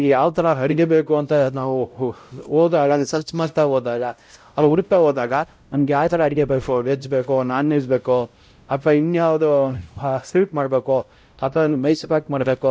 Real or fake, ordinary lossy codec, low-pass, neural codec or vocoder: fake; none; none; codec, 16 kHz, 0.5 kbps, X-Codec, WavLM features, trained on Multilingual LibriSpeech